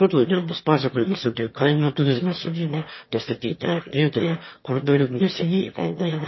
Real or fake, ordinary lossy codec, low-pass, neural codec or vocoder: fake; MP3, 24 kbps; 7.2 kHz; autoencoder, 22.05 kHz, a latent of 192 numbers a frame, VITS, trained on one speaker